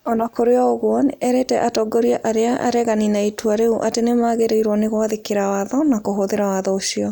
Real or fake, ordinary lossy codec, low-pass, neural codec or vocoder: real; none; none; none